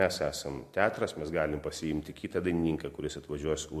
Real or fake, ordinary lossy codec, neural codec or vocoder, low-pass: real; MP3, 64 kbps; none; 14.4 kHz